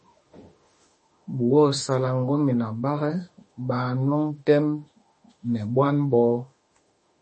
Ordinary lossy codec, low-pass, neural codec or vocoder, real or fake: MP3, 32 kbps; 10.8 kHz; autoencoder, 48 kHz, 32 numbers a frame, DAC-VAE, trained on Japanese speech; fake